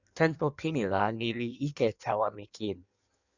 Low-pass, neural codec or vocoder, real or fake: 7.2 kHz; codec, 16 kHz in and 24 kHz out, 1.1 kbps, FireRedTTS-2 codec; fake